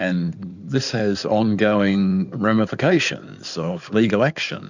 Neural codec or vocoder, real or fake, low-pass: codec, 16 kHz in and 24 kHz out, 2.2 kbps, FireRedTTS-2 codec; fake; 7.2 kHz